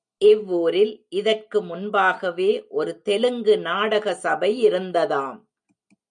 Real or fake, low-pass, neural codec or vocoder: real; 9.9 kHz; none